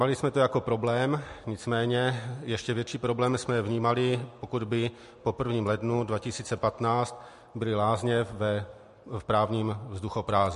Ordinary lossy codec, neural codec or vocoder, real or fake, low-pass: MP3, 48 kbps; none; real; 14.4 kHz